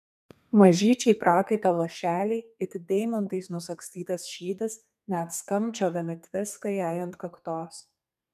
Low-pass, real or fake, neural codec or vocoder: 14.4 kHz; fake; codec, 32 kHz, 1.9 kbps, SNAC